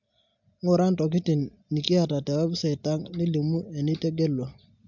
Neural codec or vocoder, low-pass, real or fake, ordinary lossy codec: none; 7.2 kHz; real; MP3, 64 kbps